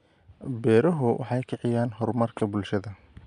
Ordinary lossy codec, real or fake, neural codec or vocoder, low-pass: none; real; none; 9.9 kHz